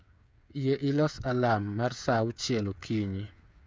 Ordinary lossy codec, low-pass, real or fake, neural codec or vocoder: none; none; fake; codec, 16 kHz, 8 kbps, FreqCodec, smaller model